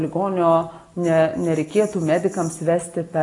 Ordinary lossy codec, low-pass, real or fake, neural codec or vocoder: AAC, 48 kbps; 10.8 kHz; real; none